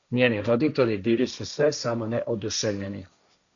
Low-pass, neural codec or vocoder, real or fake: 7.2 kHz; codec, 16 kHz, 1.1 kbps, Voila-Tokenizer; fake